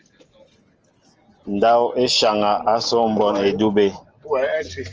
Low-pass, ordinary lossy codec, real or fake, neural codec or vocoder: 7.2 kHz; Opus, 24 kbps; real; none